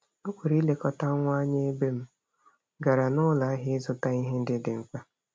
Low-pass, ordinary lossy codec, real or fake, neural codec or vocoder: none; none; real; none